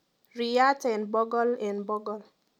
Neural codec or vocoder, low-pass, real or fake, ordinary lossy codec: none; 19.8 kHz; real; none